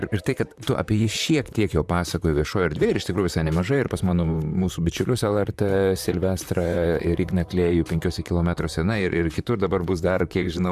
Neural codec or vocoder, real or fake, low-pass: vocoder, 44.1 kHz, 128 mel bands, Pupu-Vocoder; fake; 14.4 kHz